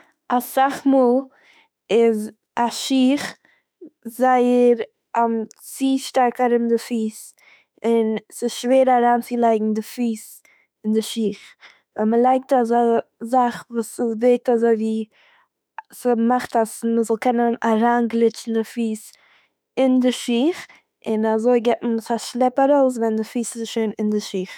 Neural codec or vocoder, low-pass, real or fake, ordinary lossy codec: autoencoder, 48 kHz, 32 numbers a frame, DAC-VAE, trained on Japanese speech; none; fake; none